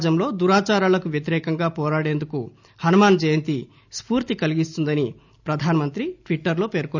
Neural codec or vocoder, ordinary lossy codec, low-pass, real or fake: none; none; 7.2 kHz; real